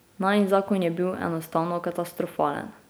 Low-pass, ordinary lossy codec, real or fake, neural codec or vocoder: none; none; real; none